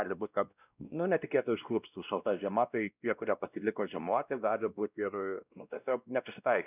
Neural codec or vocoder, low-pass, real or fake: codec, 16 kHz, 1 kbps, X-Codec, WavLM features, trained on Multilingual LibriSpeech; 3.6 kHz; fake